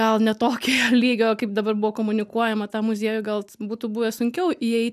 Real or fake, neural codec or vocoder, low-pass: real; none; 14.4 kHz